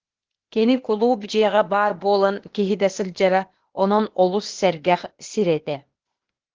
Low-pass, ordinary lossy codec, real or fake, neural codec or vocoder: 7.2 kHz; Opus, 16 kbps; fake; codec, 16 kHz, 0.8 kbps, ZipCodec